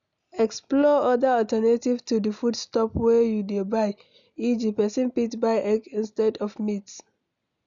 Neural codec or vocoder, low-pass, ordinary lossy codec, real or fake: none; 7.2 kHz; none; real